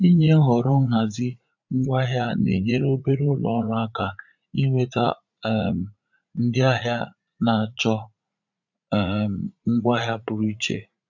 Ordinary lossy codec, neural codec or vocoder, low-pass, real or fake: none; vocoder, 44.1 kHz, 80 mel bands, Vocos; 7.2 kHz; fake